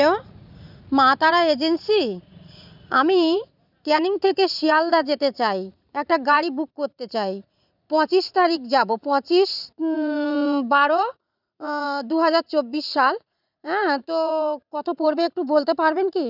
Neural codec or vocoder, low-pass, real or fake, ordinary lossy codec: vocoder, 44.1 kHz, 80 mel bands, Vocos; 5.4 kHz; fake; none